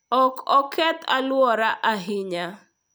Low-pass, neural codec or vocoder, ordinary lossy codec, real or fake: none; none; none; real